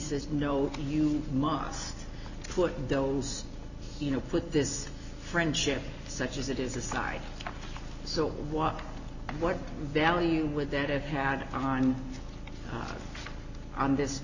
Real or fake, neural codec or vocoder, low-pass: real; none; 7.2 kHz